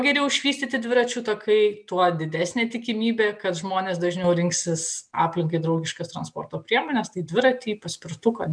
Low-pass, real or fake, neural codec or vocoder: 9.9 kHz; real; none